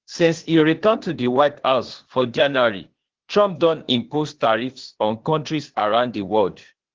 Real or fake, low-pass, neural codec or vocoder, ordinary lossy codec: fake; 7.2 kHz; codec, 16 kHz, 0.8 kbps, ZipCodec; Opus, 16 kbps